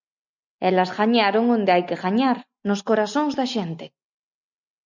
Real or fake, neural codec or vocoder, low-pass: real; none; 7.2 kHz